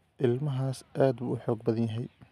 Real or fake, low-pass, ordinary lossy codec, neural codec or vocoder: real; 14.4 kHz; none; none